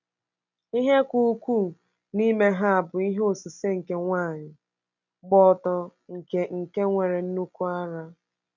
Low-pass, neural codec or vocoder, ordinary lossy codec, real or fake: 7.2 kHz; none; none; real